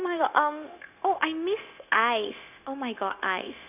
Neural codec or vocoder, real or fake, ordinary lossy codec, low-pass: codec, 16 kHz, 0.9 kbps, LongCat-Audio-Codec; fake; none; 3.6 kHz